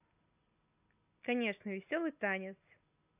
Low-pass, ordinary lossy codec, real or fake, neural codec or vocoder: 3.6 kHz; AAC, 32 kbps; real; none